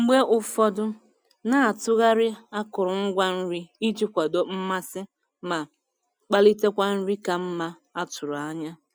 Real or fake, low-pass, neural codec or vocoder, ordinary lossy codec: real; none; none; none